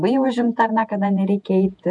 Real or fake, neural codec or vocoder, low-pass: fake; vocoder, 48 kHz, 128 mel bands, Vocos; 10.8 kHz